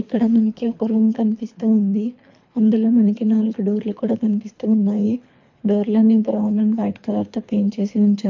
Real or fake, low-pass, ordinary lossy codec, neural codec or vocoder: fake; 7.2 kHz; MP3, 48 kbps; codec, 24 kHz, 3 kbps, HILCodec